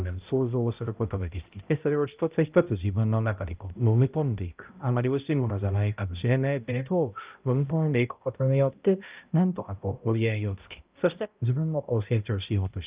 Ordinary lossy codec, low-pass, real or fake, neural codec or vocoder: Opus, 24 kbps; 3.6 kHz; fake; codec, 16 kHz, 0.5 kbps, X-Codec, HuBERT features, trained on balanced general audio